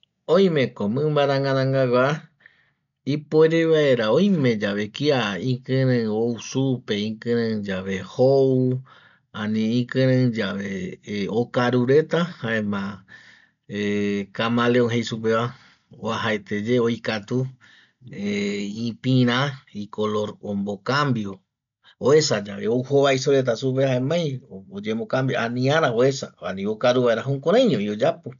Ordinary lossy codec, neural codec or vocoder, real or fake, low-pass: none; none; real; 7.2 kHz